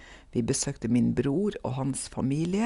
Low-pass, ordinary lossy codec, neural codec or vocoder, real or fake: 10.8 kHz; Opus, 64 kbps; none; real